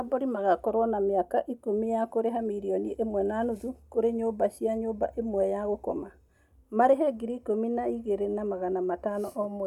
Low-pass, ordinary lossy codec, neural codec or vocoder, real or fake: 19.8 kHz; none; none; real